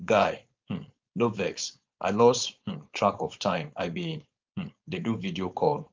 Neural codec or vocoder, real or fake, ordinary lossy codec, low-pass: codec, 16 kHz, 4.8 kbps, FACodec; fake; Opus, 24 kbps; 7.2 kHz